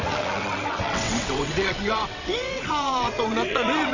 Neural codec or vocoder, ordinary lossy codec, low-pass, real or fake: vocoder, 22.05 kHz, 80 mel bands, WaveNeXt; none; 7.2 kHz; fake